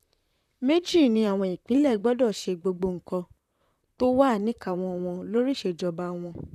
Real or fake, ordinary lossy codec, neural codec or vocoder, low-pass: fake; none; vocoder, 44.1 kHz, 128 mel bands, Pupu-Vocoder; 14.4 kHz